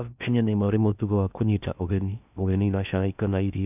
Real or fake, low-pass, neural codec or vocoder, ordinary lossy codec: fake; 3.6 kHz; codec, 16 kHz in and 24 kHz out, 0.6 kbps, FocalCodec, streaming, 2048 codes; none